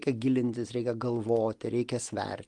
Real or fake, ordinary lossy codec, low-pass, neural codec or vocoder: real; Opus, 24 kbps; 10.8 kHz; none